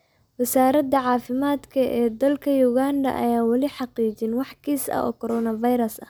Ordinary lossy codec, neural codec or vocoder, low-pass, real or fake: none; none; none; real